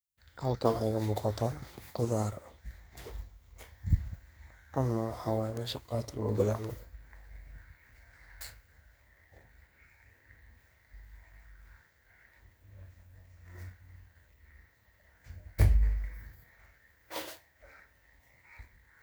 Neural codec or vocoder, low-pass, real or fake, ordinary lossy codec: codec, 44.1 kHz, 2.6 kbps, SNAC; none; fake; none